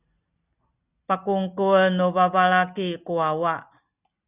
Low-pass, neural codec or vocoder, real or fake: 3.6 kHz; none; real